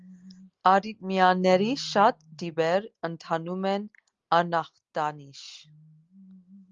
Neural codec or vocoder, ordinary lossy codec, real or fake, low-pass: none; Opus, 32 kbps; real; 7.2 kHz